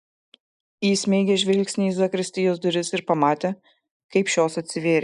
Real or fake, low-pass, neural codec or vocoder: real; 10.8 kHz; none